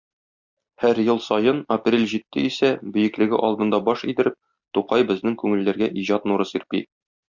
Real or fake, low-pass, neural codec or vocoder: real; 7.2 kHz; none